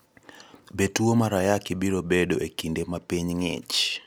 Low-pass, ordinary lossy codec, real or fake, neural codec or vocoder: none; none; real; none